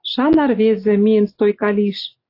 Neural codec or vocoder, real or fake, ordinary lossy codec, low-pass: none; real; AAC, 32 kbps; 5.4 kHz